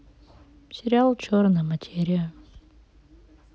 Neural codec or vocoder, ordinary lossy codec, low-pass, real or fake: none; none; none; real